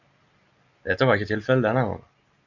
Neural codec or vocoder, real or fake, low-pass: none; real; 7.2 kHz